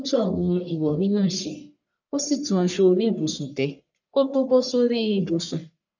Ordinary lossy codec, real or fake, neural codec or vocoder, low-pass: none; fake; codec, 44.1 kHz, 1.7 kbps, Pupu-Codec; 7.2 kHz